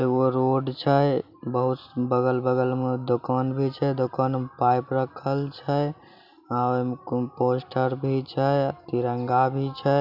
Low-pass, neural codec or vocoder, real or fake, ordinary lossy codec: 5.4 kHz; none; real; none